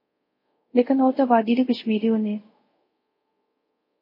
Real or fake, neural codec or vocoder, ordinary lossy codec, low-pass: fake; codec, 24 kHz, 0.5 kbps, DualCodec; MP3, 32 kbps; 5.4 kHz